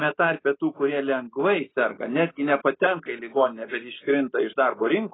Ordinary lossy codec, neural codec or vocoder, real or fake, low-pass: AAC, 16 kbps; none; real; 7.2 kHz